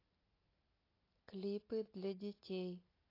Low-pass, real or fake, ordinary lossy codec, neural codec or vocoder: 5.4 kHz; real; AAC, 24 kbps; none